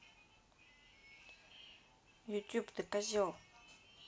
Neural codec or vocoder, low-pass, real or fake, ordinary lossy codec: none; none; real; none